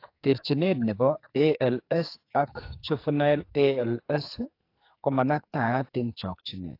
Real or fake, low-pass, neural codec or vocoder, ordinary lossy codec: fake; 5.4 kHz; codec, 44.1 kHz, 2.6 kbps, SNAC; AAC, 32 kbps